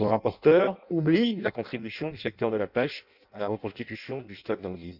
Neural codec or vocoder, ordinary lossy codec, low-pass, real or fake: codec, 16 kHz in and 24 kHz out, 0.6 kbps, FireRedTTS-2 codec; none; 5.4 kHz; fake